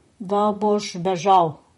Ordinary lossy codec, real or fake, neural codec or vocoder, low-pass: MP3, 48 kbps; fake; vocoder, 44.1 kHz, 128 mel bands, Pupu-Vocoder; 19.8 kHz